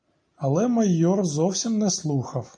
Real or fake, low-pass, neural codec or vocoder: real; 9.9 kHz; none